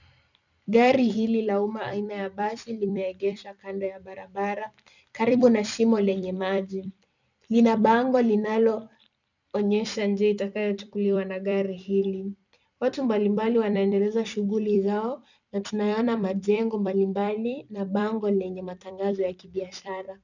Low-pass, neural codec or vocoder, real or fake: 7.2 kHz; vocoder, 44.1 kHz, 128 mel bands every 256 samples, BigVGAN v2; fake